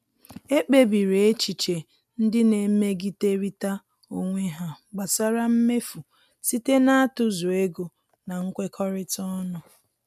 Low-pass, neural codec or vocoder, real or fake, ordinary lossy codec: 14.4 kHz; none; real; none